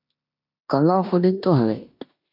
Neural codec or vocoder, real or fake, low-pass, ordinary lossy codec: codec, 16 kHz in and 24 kHz out, 0.9 kbps, LongCat-Audio-Codec, four codebook decoder; fake; 5.4 kHz; MP3, 48 kbps